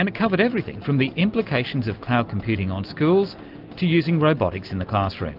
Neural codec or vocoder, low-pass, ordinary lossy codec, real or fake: none; 5.4 kHz; Opus, 24 kbps; real